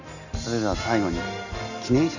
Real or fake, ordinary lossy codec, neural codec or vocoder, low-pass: real; none; none; 7.2 kHz